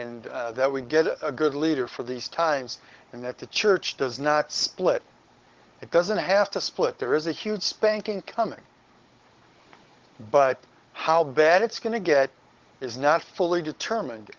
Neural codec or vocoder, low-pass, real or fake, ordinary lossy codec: codec, 16 kHz, 16 kbps, FunCodec, trained on Chinese and English, 50 frames a second; 7.2 kHz; fake; Opus, 16 kbps